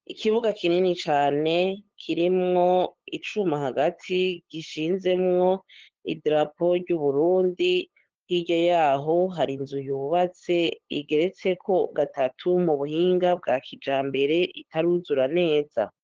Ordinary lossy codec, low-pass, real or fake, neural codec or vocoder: Opus, 16 kbps; 7.2 kHz; fake; codec, 16 kHz, 8 kbps, FunCodec, trained on LibriTTS, 25 frames a second